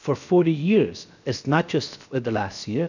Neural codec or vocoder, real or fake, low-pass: codec, 16 kHz, 0.7 kbps, FocalCodec; fake; 7.2 kHz